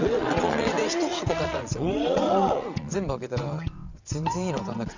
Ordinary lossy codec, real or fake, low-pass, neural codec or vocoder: Opus, 64 kbps; fake; 7.2 kHz; vocoder, 22.05 kHz, 80 mel bands, WaveNeXt